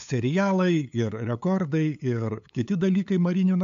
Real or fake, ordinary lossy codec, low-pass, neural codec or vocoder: fake; MP3, 64 kbps; 7.2 kHz; codec, 16 kHz, 8 kbps, FunCodec, trained on LibriTTS, 25 frames a second